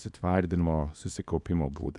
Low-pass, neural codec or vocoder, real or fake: 10.8 kHz; codec, 24 kHz, 0.9 kbps, WavTokenizer, small release; fake